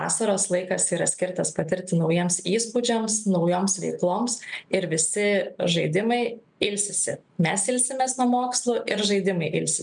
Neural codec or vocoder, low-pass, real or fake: none; 9.9 kHz; real